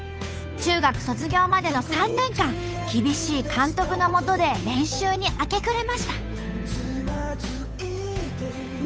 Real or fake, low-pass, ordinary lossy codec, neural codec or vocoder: fake; none; none; codec, 16 kHz, 8 kbps, FunCodec, trained on Chinese and English, 25 frames a second